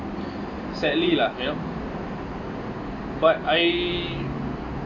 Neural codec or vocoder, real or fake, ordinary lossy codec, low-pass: none; real; AAC, 32 kbps; 7.2 kHz